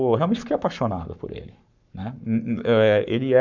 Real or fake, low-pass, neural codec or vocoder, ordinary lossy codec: fake; 7.2 kHz; codec, 44.1 kHz, 7.8 kbps, Pupu-Codec; none